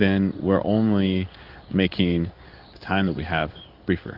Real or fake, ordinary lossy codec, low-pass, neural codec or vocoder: fake; Opus, 32 kbps; 5.4 kHz; codec, 16 kHz in and 24 kHz out, 1 kbps, XY-Tokenizer